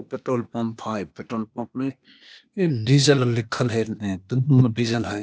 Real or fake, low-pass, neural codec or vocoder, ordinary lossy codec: fake; none; codec, 16 kHz, 0.8 kbps, ZipCodec; none